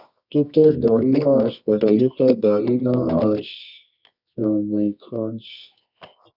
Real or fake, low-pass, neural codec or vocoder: fake; 5.4 kHz; codec, 24 kHz, 0.9 kbps, WavTokenizer, medium music audio release